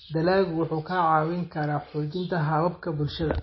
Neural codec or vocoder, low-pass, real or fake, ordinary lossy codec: none; 7.2 kHz; real; MP3, 24 kbps